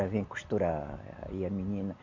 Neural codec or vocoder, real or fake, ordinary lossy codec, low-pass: none; real; MP3, 64 kbps; 7.2 kHz